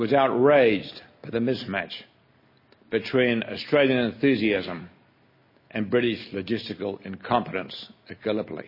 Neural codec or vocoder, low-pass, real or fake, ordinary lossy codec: none; 5.4 kHz; real; MP3, 24 kbps